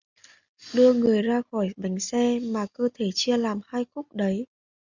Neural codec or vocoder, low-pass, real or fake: none; 7.2 kHz; real